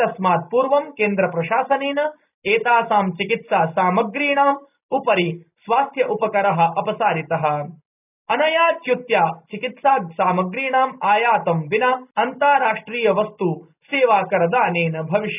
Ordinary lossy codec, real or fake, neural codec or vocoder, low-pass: none; real; none; 3.6 kHz